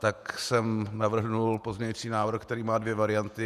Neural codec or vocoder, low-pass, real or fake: vocoder, 48 kHz, 128 mel bands, Vocos; 14.4 kHz; fake